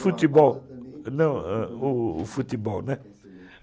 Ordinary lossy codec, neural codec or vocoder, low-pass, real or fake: none; none; none; real